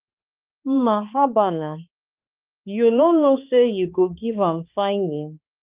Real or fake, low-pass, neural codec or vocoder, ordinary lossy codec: fake; 3.6 kHz; codec, 16 kHz, 2 kbps, X-Codec, HuBERT features, trained on balanced general audio; Opus, 24 kbps